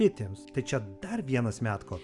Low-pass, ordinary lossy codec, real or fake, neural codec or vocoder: 10.8 kHz; Opus, 64 kbps; real; none